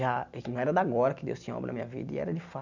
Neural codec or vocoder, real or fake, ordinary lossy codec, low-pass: none; real; none; 7.2 kHz